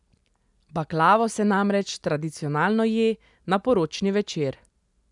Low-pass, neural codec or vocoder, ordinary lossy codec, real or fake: 10.8 kHz; none; none; real